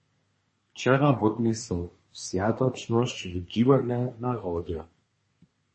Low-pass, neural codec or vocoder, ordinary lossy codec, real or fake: 9.9 kHz; codec, 24 kHz, 1 kbps, SNAC; MP3, 32 kbps; fake